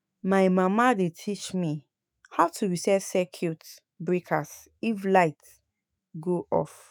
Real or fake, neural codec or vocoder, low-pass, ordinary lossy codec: fake; autoencoder, 48 kHz, 128 numbers a frame, DAC-VAE, trained on Japanese speech; none; none